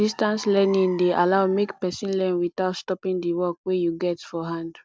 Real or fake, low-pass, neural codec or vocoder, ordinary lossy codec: real; none; none; none